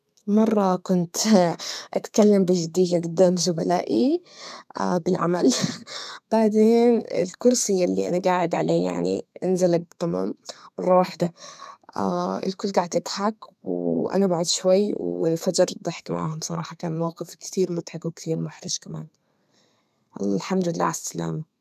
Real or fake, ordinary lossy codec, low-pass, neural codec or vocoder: fake; none; 14.4 kHz; codec, 32 kHz, 1.9 kbps, SNAC